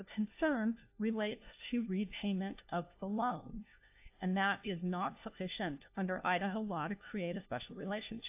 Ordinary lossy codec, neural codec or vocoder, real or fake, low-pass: AAC, 32 kbps; codec, 16 kHz, 1 kbps, FunCodec, trained on LibriTTS, 50 frames a second; fake; 3.6 kHz